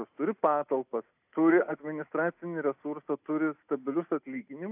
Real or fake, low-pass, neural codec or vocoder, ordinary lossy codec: real; 3.6 kHz; none; AAC, 32 kbps